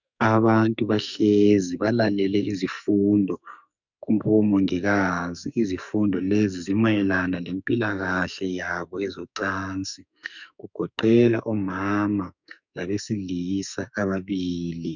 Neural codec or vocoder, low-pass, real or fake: codec, 44.1 kHz, 2.6 kbps, SNAC; 7.2 kHz; fake